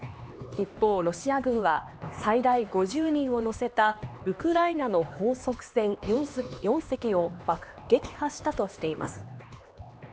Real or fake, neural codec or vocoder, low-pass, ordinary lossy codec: fake; codec, 16 kHz, 2 kbps, X-Codec, HuBERT features, trained on LibriSpeech; none; none